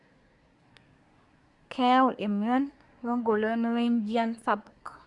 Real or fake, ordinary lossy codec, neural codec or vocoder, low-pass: fake; Opus, 64 kbps; codec, 24 kHz, 1 kbps, SNAC; 10.8 kHz